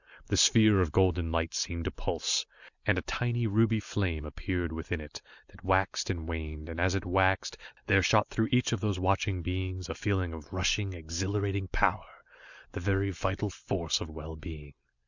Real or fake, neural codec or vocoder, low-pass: real; none; 7.2 kHz